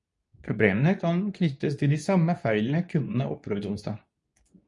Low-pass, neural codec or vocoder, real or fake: 10.8 kHz; codec, 24 kHz, 0.9 kbps, WavTokenizer, medium speech release version 2; fake